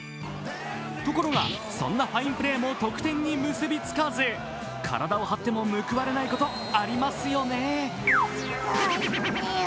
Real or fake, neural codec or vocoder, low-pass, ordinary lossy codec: real; none; none; none